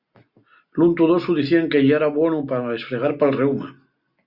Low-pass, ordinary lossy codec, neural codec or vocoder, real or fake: 5.4 kHz; Opus, 64 kbps; none; real